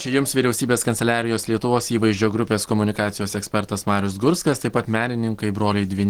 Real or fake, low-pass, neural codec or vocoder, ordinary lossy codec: real; 19.8 kHz; none; Opus, 16 kbps